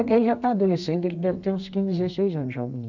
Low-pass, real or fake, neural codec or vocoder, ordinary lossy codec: 7.2 kHz; fake; codec, 32 kHz, 1.9 kbps, SNAC; none